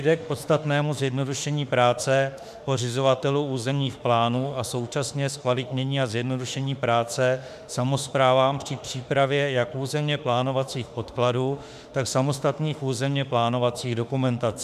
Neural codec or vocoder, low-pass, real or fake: autoencoder, 48 kHz, 32 numbers a frame, DAC-VAE, trained on Japanese speech; 14.4 kHz; fake